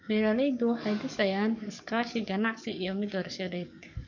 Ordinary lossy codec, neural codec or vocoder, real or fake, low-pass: none; codec, 44.1 kHz, 7.8 kbps, DAC; fake; 7.2 kHz